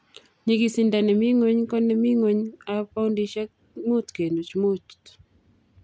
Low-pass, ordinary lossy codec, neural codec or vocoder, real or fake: none; none; none; real